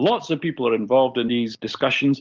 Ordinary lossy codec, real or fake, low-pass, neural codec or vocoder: Opus, 32 kbps; real; 7.2 kHz; none